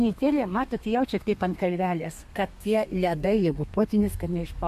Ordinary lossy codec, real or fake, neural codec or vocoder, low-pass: MP3, 64 kbps; fake; codec, 32 kHz, 1.9 kbps, SNAC; 14.4 kHz